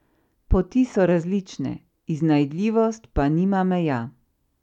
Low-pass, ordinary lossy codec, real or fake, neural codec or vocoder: 19.8 kHz; none; real; none